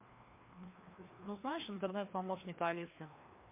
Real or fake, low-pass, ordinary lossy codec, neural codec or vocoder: fake; 3.6 kHz; MP3, 32 kbps; codec, 16 kHz, 2 kbps, FreqCodec, larger model